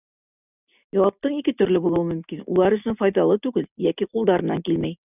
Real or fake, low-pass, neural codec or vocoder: real; 3.6 kHz; none